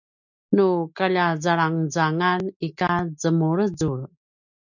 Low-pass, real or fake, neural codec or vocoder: 7.2 kHz; real; none